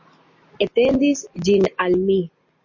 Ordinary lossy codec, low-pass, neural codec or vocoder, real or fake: MP3, 32 kbps; 7.2 kHz; none; real